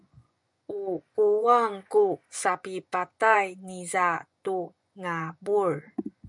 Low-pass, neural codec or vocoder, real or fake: 10.8 kHz; vocoder, 24 kHz, 100 mel bands, Vocos; fake